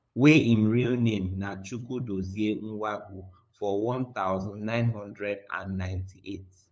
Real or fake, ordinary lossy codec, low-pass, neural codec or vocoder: fake; none; none; codec, 16 kHz, 8 kbps, FunCodec, trained on LibriTTS, 25 frames a second